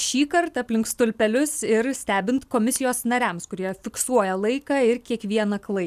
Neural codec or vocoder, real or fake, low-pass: none; real; 14.4 kHz